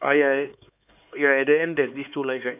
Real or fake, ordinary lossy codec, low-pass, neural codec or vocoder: fake; none; 3.6 kHz; codec, 16 kHz, 4 kbps, X-Codec, HuBERT features, trained on LibriSpeech